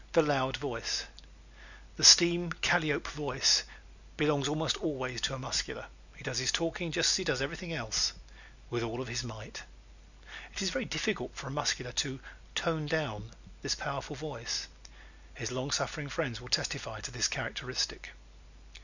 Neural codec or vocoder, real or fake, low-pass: none; real; 7.2 kHz